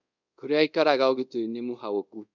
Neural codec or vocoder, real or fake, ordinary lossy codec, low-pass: codec, 24 kHz, 0.5 kbps, DualCodec; fake; none; 7.2 kHz